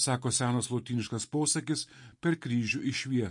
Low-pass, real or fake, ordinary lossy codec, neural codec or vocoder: 10.8 kHz; real; MP3, 48 kbps; none